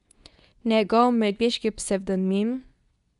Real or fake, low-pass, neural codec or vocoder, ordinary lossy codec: fake; 10.8 kHz; codec, 24 kHz, 0.9 kbps, WavTokenizer, medium speech release version 2; none